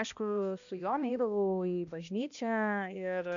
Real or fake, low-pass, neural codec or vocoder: fake; 7.2 kHz; codec, 16 kHz, 1 kbps, X-Codec, HuBERT features, trained on balanced general audio